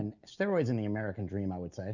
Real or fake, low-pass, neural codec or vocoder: real; 7.2 kHz; none